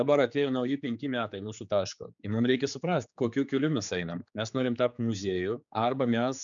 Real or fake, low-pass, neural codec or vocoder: fake; 7.2 kHz; codec, 16 kHz, 4 kbps, X-Codec, HuBERT features, trained on general audio